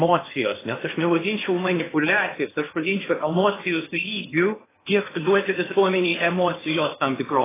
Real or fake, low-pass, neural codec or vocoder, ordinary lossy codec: fake; 3.6 kHz; codec, 16 kHz in and 24 kHz out, 0.8 kbps, FocalCodec, streaming, 65536 codes; AAC, 16 kbps